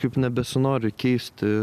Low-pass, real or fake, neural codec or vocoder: 14.4 kHz; real; none